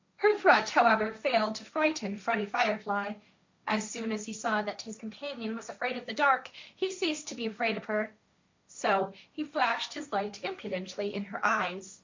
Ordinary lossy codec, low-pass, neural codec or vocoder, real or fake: MP3, 64 kbps; 7.2 kHz; codec, 16 kHz, 1.1 kbps, Voila-Tokenizer; fake